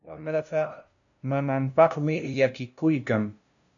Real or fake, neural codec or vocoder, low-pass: fake; codec, 16 kHz, 0.5 kbps, FunCodec, trained on LibriTTS, 25 frames a second; 7.2 kHz